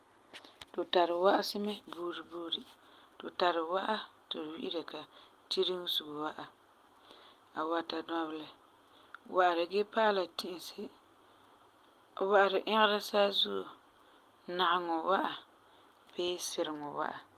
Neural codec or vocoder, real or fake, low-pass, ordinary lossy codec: none; real; 14.4 kHz; Opus, 32 kbps